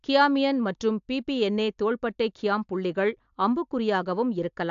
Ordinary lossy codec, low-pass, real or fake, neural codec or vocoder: AAC, 64 kbps; 7.2 kHz; real; none